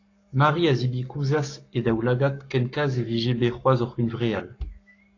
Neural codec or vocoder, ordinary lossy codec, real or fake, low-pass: codec, 44.1 kHz, 7.8 kbps, Pupu-Codec; AAC, 48 kbps; fake; 7.2 kHz